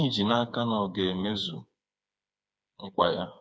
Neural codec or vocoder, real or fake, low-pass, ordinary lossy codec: codec, 16 kHz, 4 kbps, FreqCodec, smaller model; fake; none; none